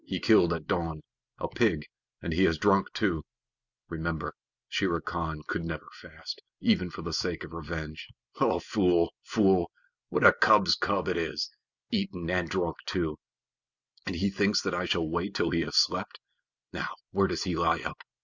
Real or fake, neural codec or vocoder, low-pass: real; none; 7.2 kHz